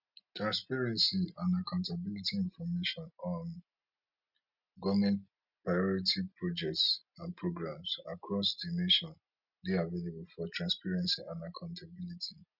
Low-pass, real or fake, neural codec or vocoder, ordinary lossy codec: 5.4 kHz; real; none; none